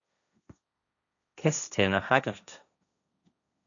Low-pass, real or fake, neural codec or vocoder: 7.2 kHz; fake; codec, 16 kHz, 1.1 kbps, Voila-Tokenizer